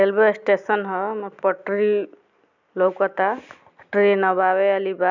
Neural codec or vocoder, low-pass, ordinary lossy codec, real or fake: none; 7.2 kHz; none; real